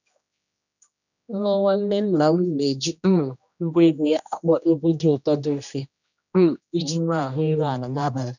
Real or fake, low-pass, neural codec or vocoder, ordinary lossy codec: fake; 7.2 kHz; codec, 16 kHz, 1 kbps, X-Codec, HuBERT features, trained on general audio; none